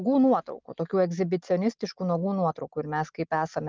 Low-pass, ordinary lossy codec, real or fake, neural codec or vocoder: 7.2 kHz; Opus, 32 kbps; real; none